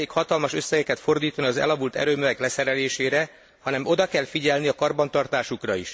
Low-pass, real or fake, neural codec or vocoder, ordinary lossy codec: none; real; none; none